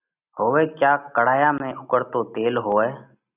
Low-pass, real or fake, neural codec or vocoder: 3.6 kHz; real; none